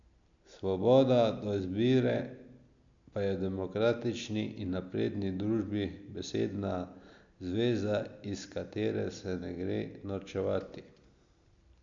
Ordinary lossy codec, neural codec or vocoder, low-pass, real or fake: MP3, 64 kbps; none; 7.2 kHz; real